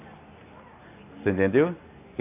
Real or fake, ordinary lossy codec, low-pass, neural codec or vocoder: real; AAC, 24 kbps; 3.6 kHz; none